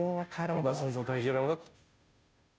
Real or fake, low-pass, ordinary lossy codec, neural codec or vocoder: fake; none; none; codec, 16 kHz, 0.5 kbps, FunCodec, trained on Chinese and English, 25 frames a second